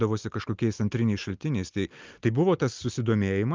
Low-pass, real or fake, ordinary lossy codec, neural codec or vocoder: 7.2 kHz; real; Opus, 32 kbps; none